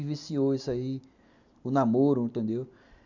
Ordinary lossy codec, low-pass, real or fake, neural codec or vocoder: none; 7.2 kHz; real; none